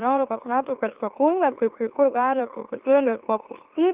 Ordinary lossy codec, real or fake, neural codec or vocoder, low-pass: Opus, 32 kbps; fake; autoencoder, 44.1 kHz, a latent of 192 numbers a frame, MeloTTS; 3.6 kHz